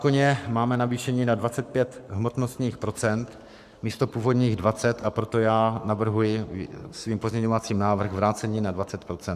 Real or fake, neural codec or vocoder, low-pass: fake; codec, 44.1 kHz, 7.8 kbps, Pupu-Codec; 14.4 kHz